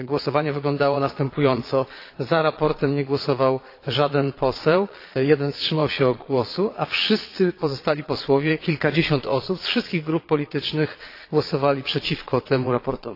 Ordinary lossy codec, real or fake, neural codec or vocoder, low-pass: AAC, 32 kbps; fake; vocoder, 22.05 kHz, 80 mel bands, Vocos; 5.4 kHz